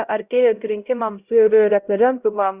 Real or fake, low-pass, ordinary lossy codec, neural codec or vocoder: fake; 3.6 kHz; Opus, 64 kbps; codec, 16 kHz, 0.5 kbps, X-Codec, HuBERT features, trained on LibriSpeech